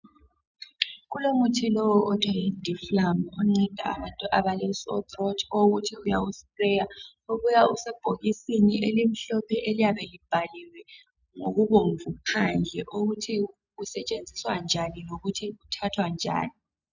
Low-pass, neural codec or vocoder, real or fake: 7.2 kHz; none; real